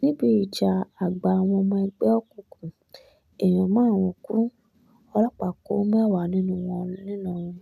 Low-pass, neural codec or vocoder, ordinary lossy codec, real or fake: 14.4 kHz; none; none; real